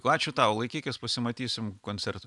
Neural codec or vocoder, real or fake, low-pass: none; real; 10.8 kHz